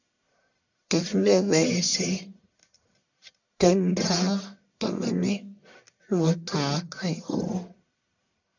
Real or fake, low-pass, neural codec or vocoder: fake; 7.2 kHz; codec, 44.1 kHz, 1.7 kbps, Pupu-Codec